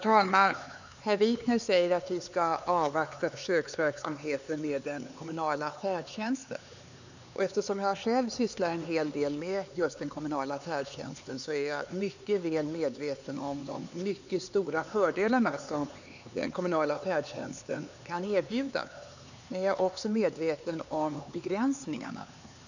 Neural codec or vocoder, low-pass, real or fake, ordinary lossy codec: codec, 16 kHz, 4 kbps, X-Codec, HuBERT features, trained on LibriSpeech; 7.2 kHz; fake; none